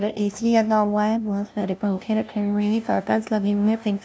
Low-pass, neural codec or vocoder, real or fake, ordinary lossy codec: none; codec, 16 kHz, 0.5 kbps, FunCodec, trained on LibriTTS, 25 frames a second; fake; none